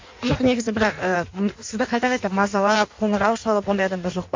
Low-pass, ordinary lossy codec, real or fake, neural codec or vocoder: 7.2 kHz; MP3, 48 kbps; fake; codec, 16 kHz in and 24 kHz out, 1.1 kbps, FireRedTTS-2 codec